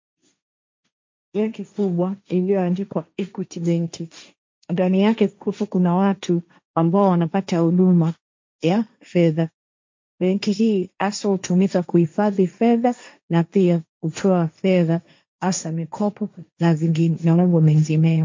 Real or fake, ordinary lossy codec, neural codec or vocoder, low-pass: fake; MP3, 48 kbps; codec, 16 kHz, 1.1 kbps, Voila-Tokenizer; 7.2 kHz